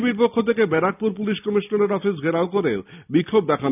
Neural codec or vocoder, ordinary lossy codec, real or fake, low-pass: none; none; real; 3.6 kHz